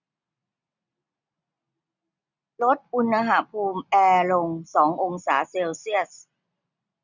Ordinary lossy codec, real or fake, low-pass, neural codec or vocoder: none; real; 7.2 kHz; none